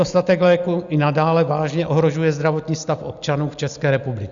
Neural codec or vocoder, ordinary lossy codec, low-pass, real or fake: none; Opus, 64 kbps; 7.2 kHz; real